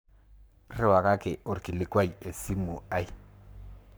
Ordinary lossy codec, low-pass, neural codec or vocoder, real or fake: none; none; codec, 44.1 kHz, 7.8 kbps, Pupu-Codec; fake